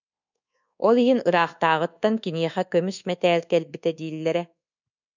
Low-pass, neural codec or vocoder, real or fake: 7.2 kHz; codec, 24 kHz, 1.2 kbps, DualCodec; fake